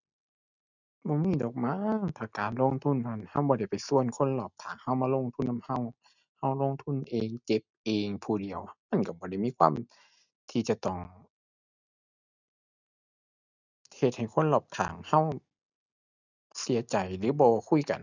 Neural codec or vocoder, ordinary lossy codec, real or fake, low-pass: none; none; real; 7.2 kHz